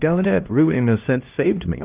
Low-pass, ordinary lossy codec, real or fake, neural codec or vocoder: 3.6 kHz; Opus, 24 kbps; fake; codec, 16 kHz, 1 kbps, X-Codec, HuBERT features, trained on LibriSpeech